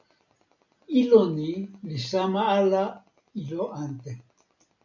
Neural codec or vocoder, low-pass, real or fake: none; 7.2 kHz; real